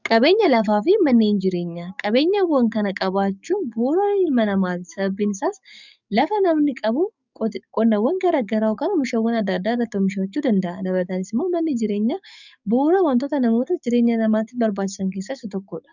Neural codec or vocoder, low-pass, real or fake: codec, 44.1 kHz, 7.8 kbps, DAC; 7.2 kHz; fake